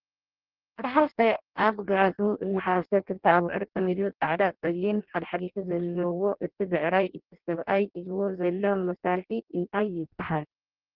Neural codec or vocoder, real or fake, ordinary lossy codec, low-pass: codec, 16 kHz in and 24 kHz out, 0.6 kbps, FireRedTTS-2 codec; fake; Opus, 16 kbps; 5.4 kHz